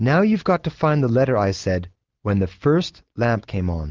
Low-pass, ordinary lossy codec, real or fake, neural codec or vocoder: 7.2 kHz; Opus, 24 kbps; real; none